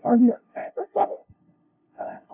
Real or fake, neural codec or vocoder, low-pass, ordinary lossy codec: fake; codec, 16 kHz, 0.5 kbps, FunCodec, trained on LibriTTS, 25 frames a second; 3.6 kHz; AAC, 24 kbps